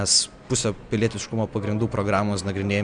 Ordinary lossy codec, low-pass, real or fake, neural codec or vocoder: Opus, 64 kbps; 9.9 kHz; real; none